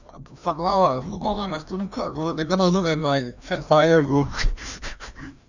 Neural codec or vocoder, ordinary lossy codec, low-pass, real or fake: codec, 16 kHz, 1 kbps, FreqCodec, larger model; none; 7.2 kHz; fake